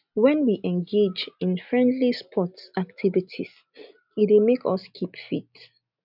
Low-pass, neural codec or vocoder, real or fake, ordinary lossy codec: 5.4 kHz; none; real; none